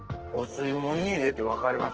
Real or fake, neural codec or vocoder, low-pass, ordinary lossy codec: fake; codec, 44.1 kHz, 2.6 kbps, SNAC; 7.2 kHz; Opus, 16 kbps